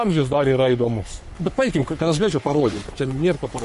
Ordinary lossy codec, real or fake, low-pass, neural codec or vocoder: MP3, 48 kbps; fake; 14.4 kHz; codec, 44.1 kHz, 3.4 kbps, Pupu-Codec